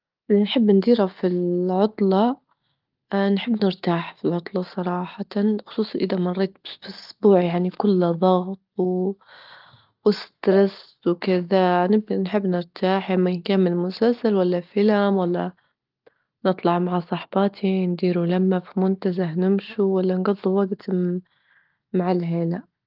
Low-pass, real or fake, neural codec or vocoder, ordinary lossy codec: 5.4 kHz; real; none; Opus, 32 kbps